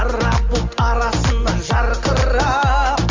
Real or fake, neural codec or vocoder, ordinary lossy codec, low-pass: real; none; Opus, 32 kbps; 7.2 kHz